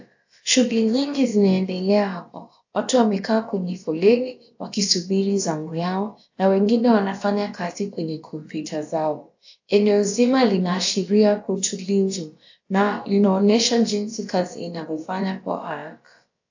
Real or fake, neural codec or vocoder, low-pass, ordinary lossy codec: fake; codec, 16 kHz, about 1 kbps, DyCAST, with the encoder's durations; 7.2 kHz; AAC, 48 kbps